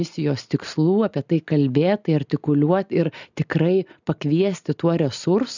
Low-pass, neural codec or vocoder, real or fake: 7.2 kHz; none; real